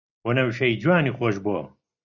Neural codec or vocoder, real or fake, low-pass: none; real; 7.2 kHz